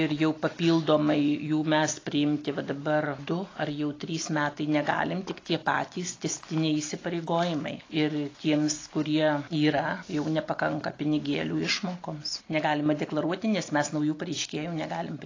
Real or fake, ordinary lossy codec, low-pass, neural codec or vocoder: real; AAC, 32 kbps; 7.2 kHz; none